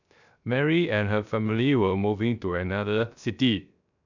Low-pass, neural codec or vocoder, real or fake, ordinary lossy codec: 7.2 kHz; codec, 16 kHz, 0.3 kbps, FocalCodec; fake; none